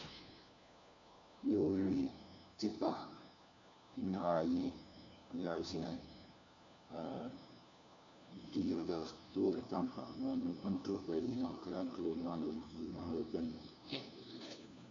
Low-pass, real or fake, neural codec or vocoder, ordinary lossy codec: 7.2 kHz; fake; codec, 16 kHz, 1 kbps, FunCodec, trained on LibriTTS, 50 frames a second; MP3, 64 kbps